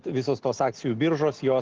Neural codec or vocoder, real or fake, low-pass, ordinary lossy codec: none; real; 7.2 kHz; Opus, 16 kbps